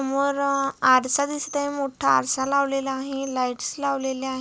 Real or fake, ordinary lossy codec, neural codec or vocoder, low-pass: real; none; none; none